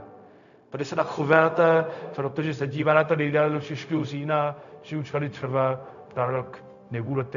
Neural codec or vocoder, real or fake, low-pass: codec, 16 kHz, 0.4 kbps, LongCat-Audio-Codec; fake; 7.2 kHz